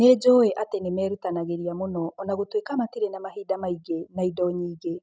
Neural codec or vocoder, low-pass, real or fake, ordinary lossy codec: none; none; real; none